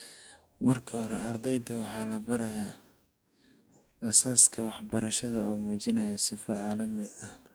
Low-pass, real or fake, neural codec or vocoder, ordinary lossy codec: none; fake; codec, 44.1 kHz, 2.6 kbps, DAC; none